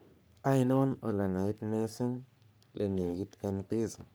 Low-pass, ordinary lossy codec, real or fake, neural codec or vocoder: none; none; fake; codec, 44.1 kHz, 3.4 kbps, Pupu-Codec